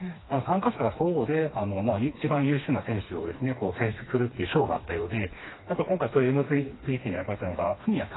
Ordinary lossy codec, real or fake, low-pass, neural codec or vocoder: AAC, 16 kbps; fake; 7.2 kHz; codec, 16 kHz, 2 kbps, FreqCodec, smaller model